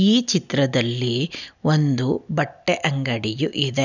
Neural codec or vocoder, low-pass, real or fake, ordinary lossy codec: none; 7.2 kHz; real; none